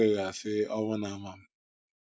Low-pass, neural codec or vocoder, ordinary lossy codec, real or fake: none; none; none; real